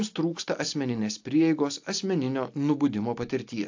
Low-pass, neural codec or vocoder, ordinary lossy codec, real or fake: 7.2 kHz; none; AAC, 48 kbps; real